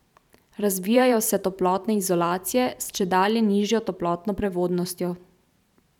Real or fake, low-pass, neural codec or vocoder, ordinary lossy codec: fake; 19.8 kHz; vocoder, 44.1 kHz, 128 mel bands every 512 samples, BigVGAN v2; none